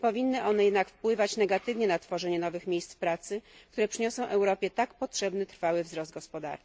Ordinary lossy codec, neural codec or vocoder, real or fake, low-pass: none; none; real; none